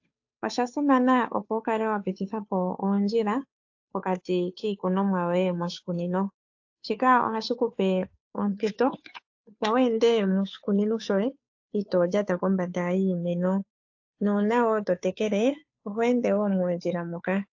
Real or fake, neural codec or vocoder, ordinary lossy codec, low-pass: fake; codec, 16 kHz, 2 kbps, FunCodec, trained on Chinese and English, 25 frames a second; AAC, 48 kbps; 7.2 kHz